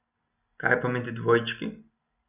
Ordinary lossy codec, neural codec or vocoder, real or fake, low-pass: none; none; real; 3.6 kHz